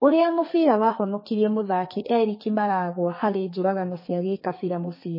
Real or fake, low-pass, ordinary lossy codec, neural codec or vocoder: fake; 5.4 kHz; MP3, 24 kbps; codec, 32 kHz, 1.9 kbps, SNAC